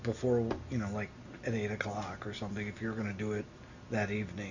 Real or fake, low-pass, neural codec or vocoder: real; 7.2 kHz; none